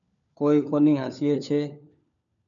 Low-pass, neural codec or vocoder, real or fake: 7.2 kHz; codec, 16 kHz, 4 kbps, FunCodec, trained on LibriTTS, 50 frames a second; fake